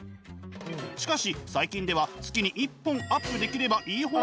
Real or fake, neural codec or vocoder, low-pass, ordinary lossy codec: real; none; none; none